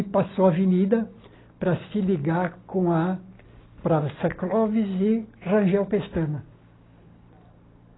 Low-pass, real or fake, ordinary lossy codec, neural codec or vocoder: 7.2 kHz; real; AAC, 16 kbps; none